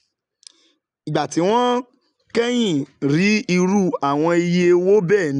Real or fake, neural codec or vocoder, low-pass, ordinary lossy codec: real; none; 9.9 kHz; none